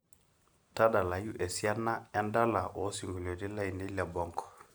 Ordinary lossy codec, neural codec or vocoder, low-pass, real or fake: none; none; none; real